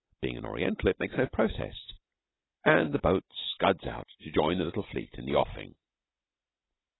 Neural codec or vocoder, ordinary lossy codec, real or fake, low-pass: none; AAC, 16 kbps; real; 7.2 kHz